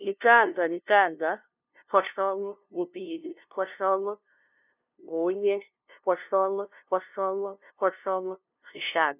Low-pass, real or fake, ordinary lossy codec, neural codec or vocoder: 3.6 kHz; fake; none; codec, 16 kHz, 0.5 kbps, FunCodec, trained on LibriTTS, 25 frames a second